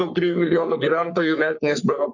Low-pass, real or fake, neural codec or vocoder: 7.2 kHz; fake; codec, 44.1 kHz, 3.4 kbps, Pupu-Codec